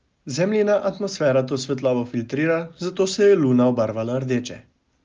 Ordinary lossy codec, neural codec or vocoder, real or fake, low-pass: Opus, 24 kbps; none; real; 7.2 kHz